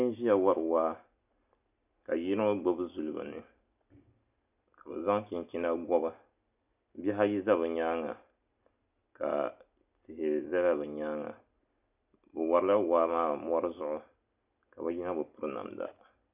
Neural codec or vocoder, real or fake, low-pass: none; real; 3.6 kHz